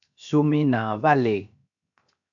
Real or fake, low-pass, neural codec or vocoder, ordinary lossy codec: fake; 7.2 kHz; codec, 16 kHz, 0.7 kbps, FocalCodec; AAC, 64 kbps